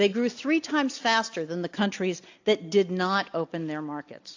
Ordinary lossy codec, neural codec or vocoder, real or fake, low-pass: AAC, 48 kbps; none; real; 7.2 kHz